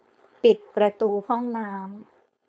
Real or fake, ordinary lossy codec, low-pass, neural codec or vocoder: fake; none; none; codec, 16 kHz, 4.8 kbps, FACodec